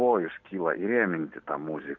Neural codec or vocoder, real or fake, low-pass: none; real; 7.2 kHz